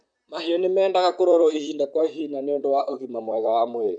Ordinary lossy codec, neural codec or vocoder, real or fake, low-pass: none; vocoder, 44.1 kHz, 128 mel bands, Pupu-Vocoder; fake; 9.9 kHz